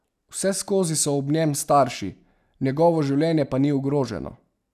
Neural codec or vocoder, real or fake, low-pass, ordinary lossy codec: none; real; 14.4 kHz; none